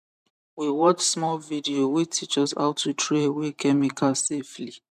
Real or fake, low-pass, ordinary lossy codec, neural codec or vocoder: fake; 14.4 kHz; none; vocoder, 44.1 kHz, 128 mel bands every 512 samples, BigVGAN v2